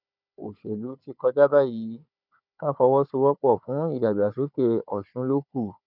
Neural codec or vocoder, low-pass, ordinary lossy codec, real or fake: codec, 16 kHz, 4 kbps, FunCodec, trained on Chinese and English, 50 frames a second; 5.4 kHz; none; fake